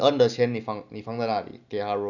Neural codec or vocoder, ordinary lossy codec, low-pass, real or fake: none; none; 7.2 kHz; real